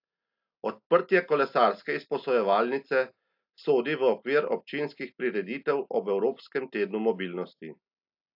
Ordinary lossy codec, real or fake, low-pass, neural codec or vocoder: AAC, 48 kbps; real; 5.4 kHz; none